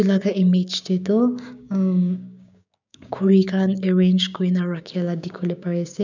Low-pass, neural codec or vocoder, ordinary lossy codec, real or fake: 7.2 kHz; codec, 16 kHz, 6 kbps, DAC; none; fake